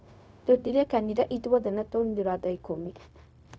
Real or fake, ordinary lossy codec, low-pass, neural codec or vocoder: fake; none; none; codec, 16 kHz, 0.4 kbps, LongCat-Audio-Codec